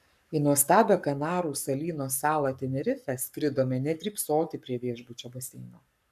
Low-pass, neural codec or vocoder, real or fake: 14.4 kHz; codec, 44.1 kHz, 7.8 kbps, Pupu-Codec; fake